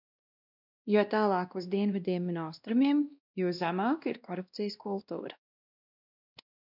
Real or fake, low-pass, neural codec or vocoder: fake; 5.4 kHz; codec, 16 kHz, 1 kbps, X-Codec, WavLM features, trained on Multilingual LibriSpeech